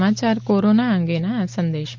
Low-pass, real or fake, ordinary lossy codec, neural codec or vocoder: 7.2 kHz; real; Opus, 24 kbps; none